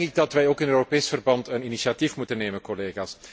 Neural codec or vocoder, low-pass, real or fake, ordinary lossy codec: none; none; real; none